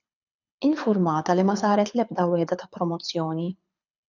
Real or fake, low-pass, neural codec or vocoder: fake; 7.2 kHz; codec, 24 kHz, 6 kbps, HILCodec